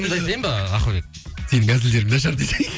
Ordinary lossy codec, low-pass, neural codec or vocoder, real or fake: none; none; none; real